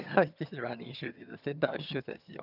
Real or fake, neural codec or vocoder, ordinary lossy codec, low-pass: fake; vocoder, 22.05 kHz, 80 mel bands, HiFi-GAN; none; 5.4 kHz